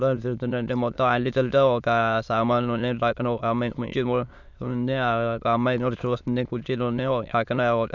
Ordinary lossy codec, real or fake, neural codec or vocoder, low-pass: none; fake; autoencoder, 22.05 kHz, a latent of 192 numbers a frame, VITS, trained on many speakers; 7.2 kHz